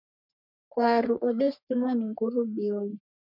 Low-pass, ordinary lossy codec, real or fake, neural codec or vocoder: 5.4 kHz; MP3, 48 kbps; fake; codec, 44.1 kHz, 3.4 kbps, Pupu-Codec